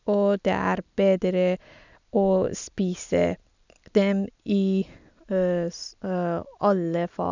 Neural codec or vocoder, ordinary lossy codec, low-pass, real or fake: none; none; 7.2 kHz; real